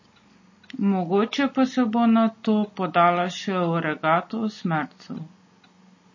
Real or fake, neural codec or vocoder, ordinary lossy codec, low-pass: real; none; MP3, 32 kbps; 7.2 kHz